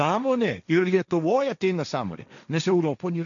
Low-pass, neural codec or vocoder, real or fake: 7.2 kHz; codec, 16 kHz, 1.1 kbps, Voila-Tokenizer; fake